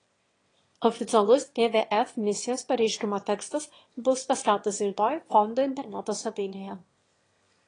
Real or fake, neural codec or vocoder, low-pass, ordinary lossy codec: fake; autoencoder, 22.05 kHz, a latent of 192 numbers a frame, VITS, trained on one speaker; 9.9 kHz; AAC, 32 kbps